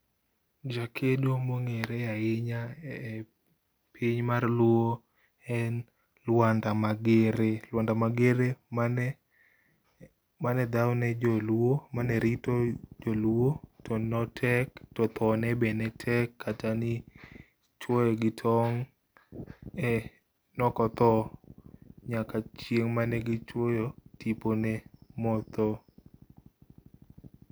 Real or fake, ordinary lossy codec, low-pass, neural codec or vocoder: real; none; none; none